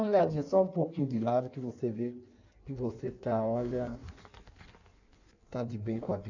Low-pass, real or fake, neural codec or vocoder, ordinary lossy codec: 7.2 kHz; fake; codec, 16 kHz in and 24 kHz out, 1.1 kbps, FireRedTTS-2 codec; none